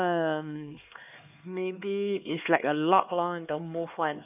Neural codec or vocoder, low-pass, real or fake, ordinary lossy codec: codec, 16 kHz, 2 kbps, X-Codec, HuBERT features, trained on LibriSpeech; 3.6 kHz; fake; none